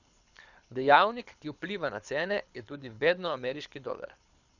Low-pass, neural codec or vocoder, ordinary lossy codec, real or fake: 7.2 kHz; codec, 24 kHz, 6 kbps, HILCodec; none; fake